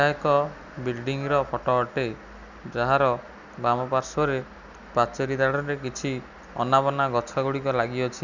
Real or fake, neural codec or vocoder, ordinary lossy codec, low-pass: real; none; none; 7.2 kHz